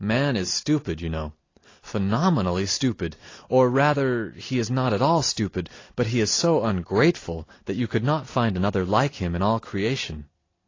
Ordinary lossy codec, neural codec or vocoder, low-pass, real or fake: AAC, 32 kbps; none; 7.2 kHz; real